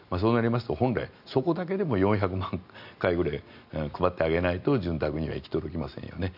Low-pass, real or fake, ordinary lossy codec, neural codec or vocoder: 5.4 kHz; real; none; none